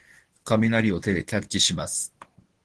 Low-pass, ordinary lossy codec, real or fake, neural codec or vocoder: 10.8 kHz; Opus, 16 kbps; fake; codec, 24 kHz, 0.9 kbps, WavTokenizer, medium speech release version 1